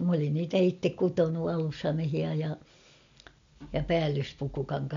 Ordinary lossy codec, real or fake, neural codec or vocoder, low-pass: MP3, 64 kbps; real; none; 7.2 kHz